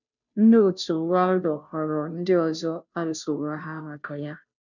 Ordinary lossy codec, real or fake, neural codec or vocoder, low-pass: none; fake; codec, 16 kHz, 0.5 kbps, FunCodec, trained on Chinese and English, 25 frames a second; 7.2 kHz